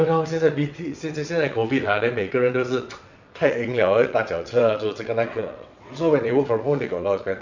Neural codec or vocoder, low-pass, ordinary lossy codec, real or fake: vocoder, 22.05 kHz, 80 mel bands, WaveNeXt; 7.2 kHz; none; fake